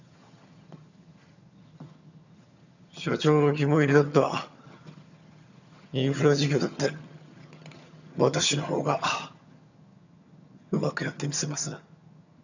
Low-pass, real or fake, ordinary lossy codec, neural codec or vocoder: 7.2 kHz; fake; none; vocoder, 22.05 kHz, 80 mel bands, HiFi-GAN